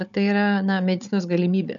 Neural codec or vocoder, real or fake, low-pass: codec, 16 kHz, 16 kbps, FunCodec, trained on Chinese and English, 50 frames a second; fake; 7.2 kHz